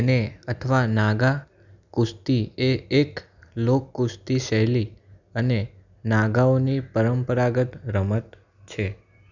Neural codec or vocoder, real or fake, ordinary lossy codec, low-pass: none; real; none; 7.2 kHz